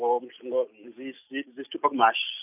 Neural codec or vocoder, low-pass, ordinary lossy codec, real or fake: none; 3.6 kHz; none; real